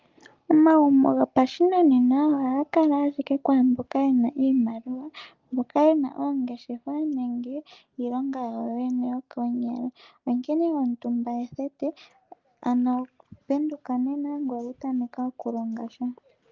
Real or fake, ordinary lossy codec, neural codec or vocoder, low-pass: real; Opus, 32 kbps; none; 7.2 kHz